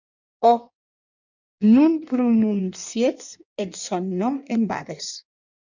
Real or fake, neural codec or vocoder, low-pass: fake; codec, 16 kHz in and 24 kHz out, 1.1 kbps, FireRedTTS-2 codec; 7.2 kHz